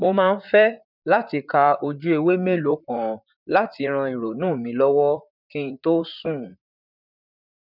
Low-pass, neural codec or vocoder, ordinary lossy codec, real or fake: 5.4 kHz; vocoder, 44.1 kHz, 128 mel bands, Pupu-Vocoder; none; fake